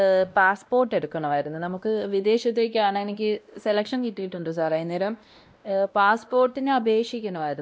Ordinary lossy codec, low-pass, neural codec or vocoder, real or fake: none; none; codec, 16 kHz, 1 kbps, X-Codec, WavLM features, trained on Multilingual LibriSpeech; fake